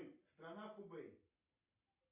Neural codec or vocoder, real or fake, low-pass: none; real; 3.6 kHz